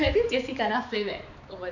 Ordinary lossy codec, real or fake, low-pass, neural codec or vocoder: none; fake; 7.2 kHz; codec, 16 kHz, 4 kbps, X-Codec, HuBERT features, trained on balanced general audio